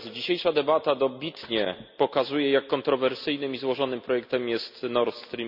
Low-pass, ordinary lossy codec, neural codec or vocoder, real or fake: 5.4 kHz; none; none; real